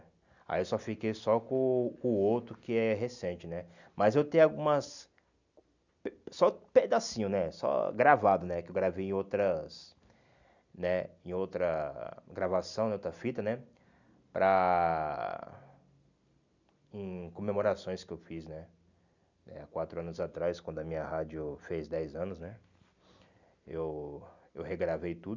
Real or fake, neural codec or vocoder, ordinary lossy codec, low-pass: real; none; none; 7.2 kHz